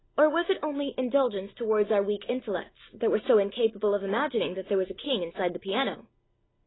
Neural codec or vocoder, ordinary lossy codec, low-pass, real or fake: none; AAC, 16 kbps; 7.2 kHz; real